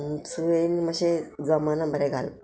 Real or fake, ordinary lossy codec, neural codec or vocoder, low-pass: real; none; none; none